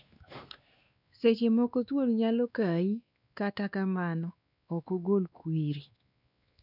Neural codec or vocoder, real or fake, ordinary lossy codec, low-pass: codec, 16 kHz, 2 kbps, X-Codec, WavLM features, trained on Multilingual LibriSpeech; fake; none; 5.4 kHz